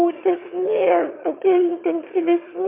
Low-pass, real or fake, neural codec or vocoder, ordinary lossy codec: 3.6 kHz; fake; autoencoder, 22.05 kHz, a latent of 192 numbers a frame, VITS, trained on one speaker; MP3, 32 kbps